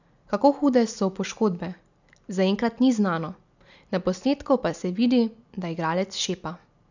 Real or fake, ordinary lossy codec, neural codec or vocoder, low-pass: real; none; none; 7.2 kHz